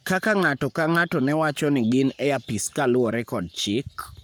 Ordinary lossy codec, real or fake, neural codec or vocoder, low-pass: none; fake; codec, 44.1 kHz, 7.8 kbps, Pupu-Codec; none